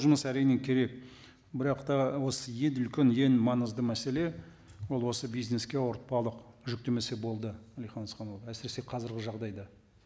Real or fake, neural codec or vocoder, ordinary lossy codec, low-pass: real; none; none; none